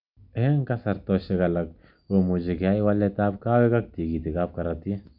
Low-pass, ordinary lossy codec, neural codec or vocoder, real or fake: 5.4 kHz; none; none; real